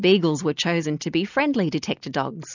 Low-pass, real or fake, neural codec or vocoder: 7.2 kHz; real; none